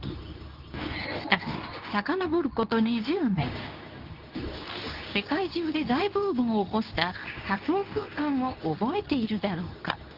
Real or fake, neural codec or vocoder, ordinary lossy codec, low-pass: fake; codec, 24 kHz, 0.9 kbps, WavTokenizer, medium speech release version 2; Opus, 24 kbps; 5.4 kHz